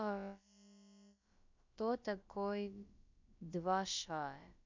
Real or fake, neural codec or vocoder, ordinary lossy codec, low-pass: fake; codec, 16 kHz, about 1 kbps, DyCAST, with the encoder's durations; MP3, 64 kbps; 7.2 kHz